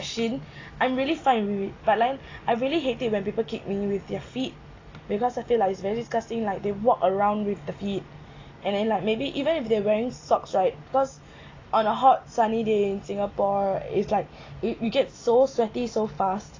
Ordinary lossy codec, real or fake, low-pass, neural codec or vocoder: AAC, 32 kbps; real; 7.2 kHz; none